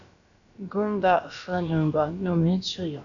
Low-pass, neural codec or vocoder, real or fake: 7.2 kHz; codec, 16 kHz, about 1 kbps, DyCAST, with the encoder's durations; fake